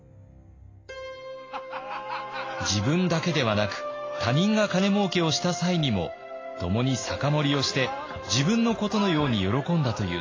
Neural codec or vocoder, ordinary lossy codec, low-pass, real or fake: none; AAC, 32 kbps; 7.2 kHz; real